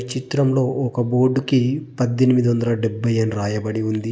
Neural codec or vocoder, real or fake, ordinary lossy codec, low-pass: none; real; none; none